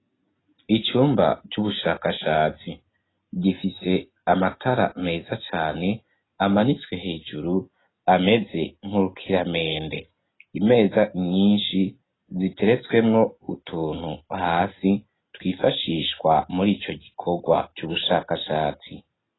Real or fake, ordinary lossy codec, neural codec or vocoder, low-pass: real; AAC, 16 kbps; none; 7.2 kHz